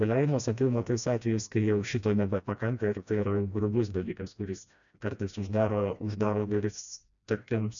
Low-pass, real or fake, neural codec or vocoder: 7.2 kHz; fake; codec, 16 kHz, 1 kbps, FreqCodec, smaller model